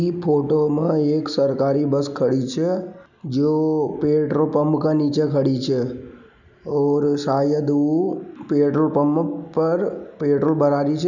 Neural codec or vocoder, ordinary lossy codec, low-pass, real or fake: none; none; 7.2 kHz; real